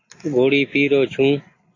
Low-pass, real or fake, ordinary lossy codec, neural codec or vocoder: 7.2 kHz; real; AAC, 32 kbps; none